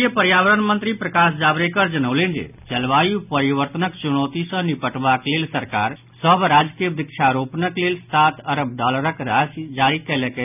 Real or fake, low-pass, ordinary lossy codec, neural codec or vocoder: real; 3.6 kHz; none; none